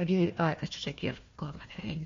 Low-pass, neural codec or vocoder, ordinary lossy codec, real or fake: 7.2 kHz; codec, 16 kHz, 1 kbps, FunCodec, trained on Chinese and English, 50 frames a second; MP3, 48 kbps; fake